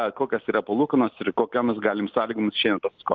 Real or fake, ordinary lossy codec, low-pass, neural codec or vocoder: real; Opus, 32 kbps; 7.2 kHz; none